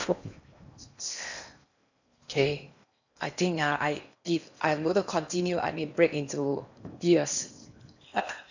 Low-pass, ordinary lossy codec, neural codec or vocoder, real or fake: 7.2 kHz; none; codec, 16 kHz in and 24 kHz out, 0.8 kbps, FocalCodec, streaming, 65536 codes; fake